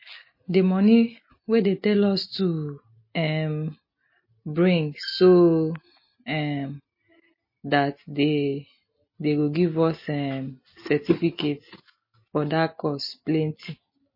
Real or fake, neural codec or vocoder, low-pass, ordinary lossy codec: real; none; 5.4 kHz; MP3, 24 kbps